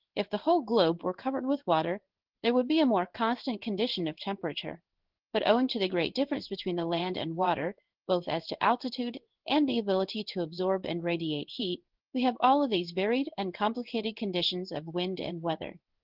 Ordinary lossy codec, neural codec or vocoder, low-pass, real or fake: Opus, 16 kbps; codec, 16 kHz in and 24 kHz out, 1 kbps, XY-Tokenizer; 5.4 kHz; fake